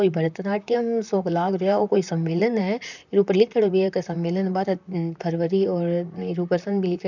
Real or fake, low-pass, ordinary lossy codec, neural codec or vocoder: fake; 7.2 kHz; none; vocoder, 44.1 kHz, 128 mel bands, Pupu-Vocoder